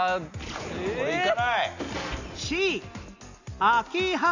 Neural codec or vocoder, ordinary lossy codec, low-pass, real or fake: none; none; 7.2 kHz; real